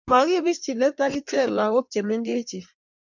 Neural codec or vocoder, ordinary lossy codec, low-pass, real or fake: codec, 16 kHz in and 24 kHz out, 1.1 kbps, FireRedTTS-2 codec; MP3, 64 kbps; 7.2 kHz; fake